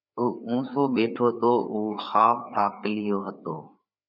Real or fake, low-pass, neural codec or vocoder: fake; 5.4 kHz; codec, 16 kHz, 4 kbps, FreqCodec, larger model